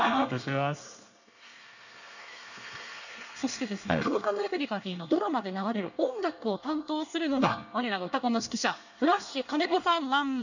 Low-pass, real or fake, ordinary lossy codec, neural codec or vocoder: 7.2 kHz; fake; none; codec, 24 kHz, 1 kbps, SNAC